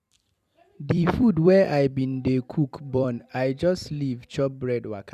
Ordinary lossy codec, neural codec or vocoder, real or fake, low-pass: none; vocoder, 44.1 kHz, 128 mel bands every 256 samples, BigVGAN v2; fake; 14.4 kHz